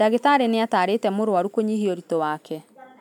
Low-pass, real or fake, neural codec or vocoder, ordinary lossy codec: 19.8 kHz; real; none; none